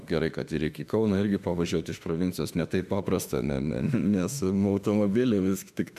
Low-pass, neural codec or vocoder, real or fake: 14.4 kHz; autoencoder, 48 kHz, 32 numbers a frame, DAC-VAE, trained on Japanese speech; fake